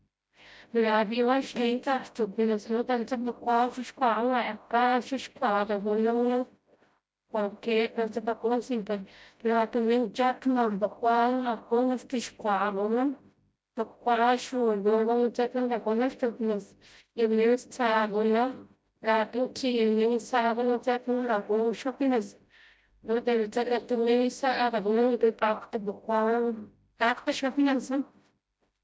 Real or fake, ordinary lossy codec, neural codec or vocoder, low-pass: fake; none; codec, 16 kHz, 0.5 kbps, FreqCodec, smaller model; none